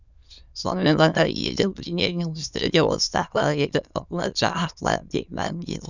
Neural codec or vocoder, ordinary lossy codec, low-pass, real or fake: autoencoder, 22.05 kHz, a latent of 192 numbers a frame, VITS, trained on many speakers; none; 7.2 kHz; fake